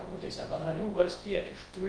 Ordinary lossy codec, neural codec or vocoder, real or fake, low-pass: Opus, 24 kbps; codec, 24 kHz, 0.9 kbps, WavTokenizer, large speech release; fake; 9.9 kHz